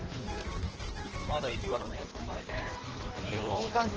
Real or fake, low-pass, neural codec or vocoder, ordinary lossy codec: fake; 7.2 kHz; codec, 16 kHz in and 24 kHz out, 1.1 kbps, FireRedTTS-2 codec; Opus, 16 kbps